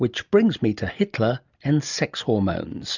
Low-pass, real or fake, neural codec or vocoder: 7.2 kHz; real; none